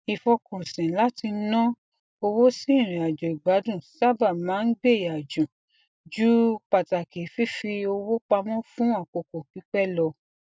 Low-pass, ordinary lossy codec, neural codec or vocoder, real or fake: none; none; none; real